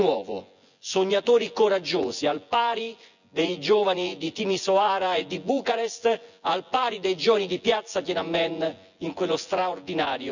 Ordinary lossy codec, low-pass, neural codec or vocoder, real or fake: none; 7.2 kHz; vocoder, 24 kHz, 100 mel bands, Vocos; fake